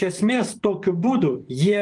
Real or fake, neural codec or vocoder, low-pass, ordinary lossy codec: fake; vocoder, 24 kHz, 100 mel bands, Vocos; 10.8 kHz; Opus, 32 kbps